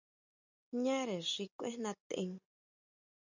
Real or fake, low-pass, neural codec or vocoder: real; 7.2 kHz; none